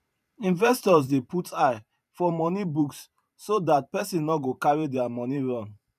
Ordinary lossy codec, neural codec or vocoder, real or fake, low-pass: none; vocoder, 44.1 kHz, 128 mel bands every 512 samples, BigVGAN v2; fake; 14.4 kHz